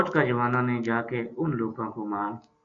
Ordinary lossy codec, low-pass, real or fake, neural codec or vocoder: Opus, 64 kbps; 7.2 kHz; real; none